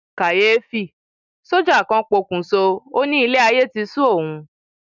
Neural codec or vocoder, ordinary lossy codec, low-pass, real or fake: none; none; 7.2 kHz; real